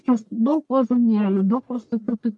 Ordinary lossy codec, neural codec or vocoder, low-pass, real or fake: MP3, 64 kbps; codec, 44.1 kHz, 1.7 kbps, Pupu-Codec; 10.8 kHz; fake